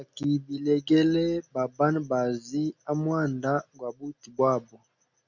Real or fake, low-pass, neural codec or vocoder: real; 7.2 kHz; none